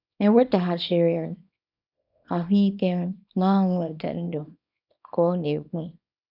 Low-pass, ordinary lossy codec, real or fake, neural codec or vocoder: 5.4 kHz; none; fake; codec, 24 kHz, 0.9 kbps, WavTokenizer, small release